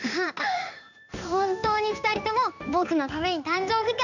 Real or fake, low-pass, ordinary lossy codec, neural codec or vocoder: fake; 7.2 kHz; none; codec, 16 kHz in and 24 kHz out, 1 kbps, XY-Tokenizer